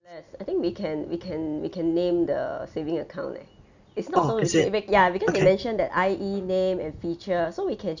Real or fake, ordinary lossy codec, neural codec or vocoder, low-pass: real; none; none; 7.2 kHz